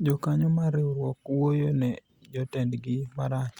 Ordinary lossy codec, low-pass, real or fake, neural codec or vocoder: Opus, 64 kbps; 19.8 kHz; real; none